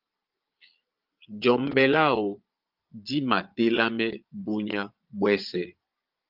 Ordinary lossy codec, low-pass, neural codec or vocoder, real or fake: Opus, 24 kbps; 5.4 kHz; vocoder, 22.05 kHz, 80 mel bands, Vocos; fake